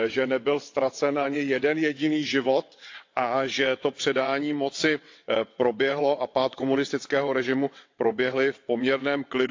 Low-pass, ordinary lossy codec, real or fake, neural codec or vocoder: 7.2 kHz; AAC, 48 kbps; fake; vocoder, 22.05 kHz, 80 mel bands, WaveNeXt